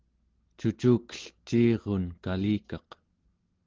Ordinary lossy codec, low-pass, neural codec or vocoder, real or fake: Opus, 16 kbps; 7.2 kHz; none; real